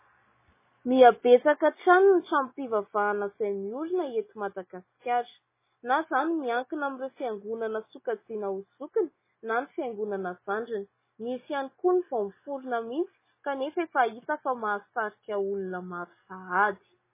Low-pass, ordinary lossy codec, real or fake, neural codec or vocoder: 3.6 kHz; MP3, 16 kbps; real; none